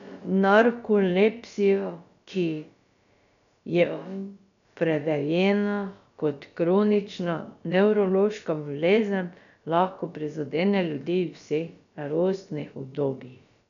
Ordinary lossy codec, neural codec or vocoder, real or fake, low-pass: none; codec, 16 kHz, about 1 kbps, DyCAST, with the encoder's durations; fake; 7.2 kHz